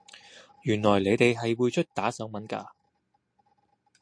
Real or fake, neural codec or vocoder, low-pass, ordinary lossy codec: real; none; 9.9 kHz; MP3, 48 kbps